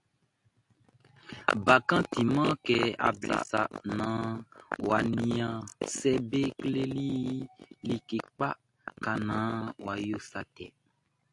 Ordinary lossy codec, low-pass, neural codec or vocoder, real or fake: AAC, 64 kbps; 10.8 kHz; none; real